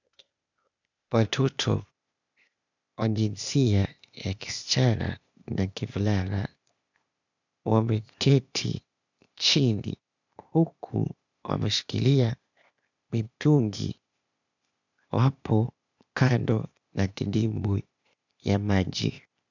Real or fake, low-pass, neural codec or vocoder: fake; 7.2 kHz; codec, 16 kHz, 0.8 kbps, ZipCodec